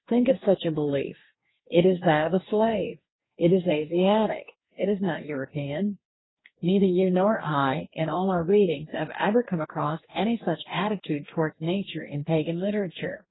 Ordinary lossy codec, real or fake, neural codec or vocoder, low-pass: AAC, 16 kbps; fake; codec, 44.1 kHz, 2.6 kbps, DAC; 7.2 kHz